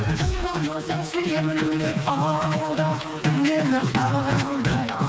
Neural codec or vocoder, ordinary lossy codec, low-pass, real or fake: codec, 16 kHz, 2 kbps, FreqCodec, smaller model; none; none; fake